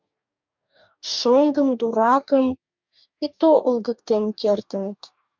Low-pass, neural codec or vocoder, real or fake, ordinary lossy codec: 7.2 kHz; codec, 44.1 kHz, 2.6 kbps, DAC; fake; MP3, 64 kbps